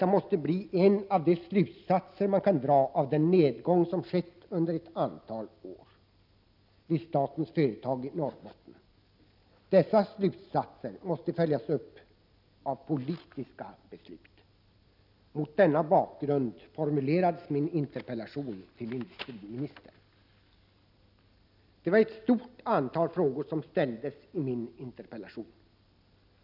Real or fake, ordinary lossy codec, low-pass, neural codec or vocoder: real; none; 5.4 kHz; none